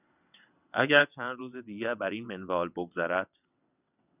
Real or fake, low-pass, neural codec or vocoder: fake; 3.6 kHz; vocoder, 22.05 kHz, 80 mel bands, WaveNeXt